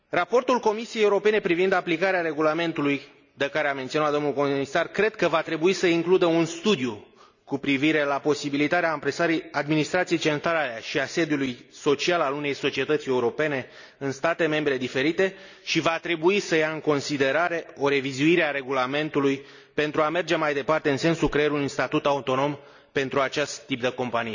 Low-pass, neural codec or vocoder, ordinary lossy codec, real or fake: 7.2 kHz; none; none; real